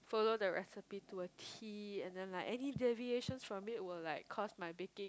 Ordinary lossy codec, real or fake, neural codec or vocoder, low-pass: none; real; none; none